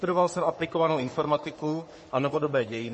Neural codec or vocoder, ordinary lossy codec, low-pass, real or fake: codec, 44.1 kHz, 3.4 kbps, Pupu-Codec; MP3, 32 kbps; 10.8 kHz; fake